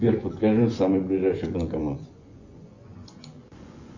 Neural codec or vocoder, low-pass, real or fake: vocoder, 24 kHz, 100 mel bands, Vocos; 7.2 kHz; fake